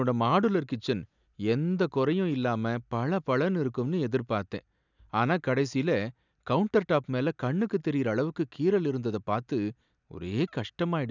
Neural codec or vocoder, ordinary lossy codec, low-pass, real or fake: none; none; 7.2 kHz; real